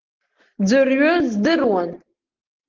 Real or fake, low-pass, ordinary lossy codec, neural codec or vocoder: real; 7.2 kHz; Opus, 16 kbps; none